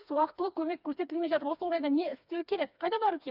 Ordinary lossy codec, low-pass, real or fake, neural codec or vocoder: none; 5.4 kHz; fake; codec, 16 kHz, 2 kbps, FreqCodec, smaller model